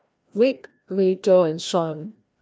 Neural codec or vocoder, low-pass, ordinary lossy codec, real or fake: codec, 16 kHz, 1 kbps, FreqCodec, larger model; none; none; fake